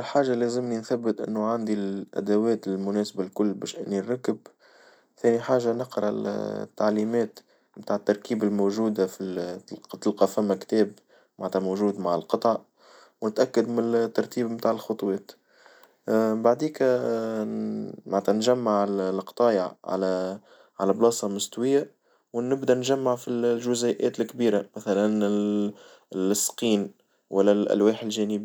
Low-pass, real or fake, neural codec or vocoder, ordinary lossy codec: none; real; none; none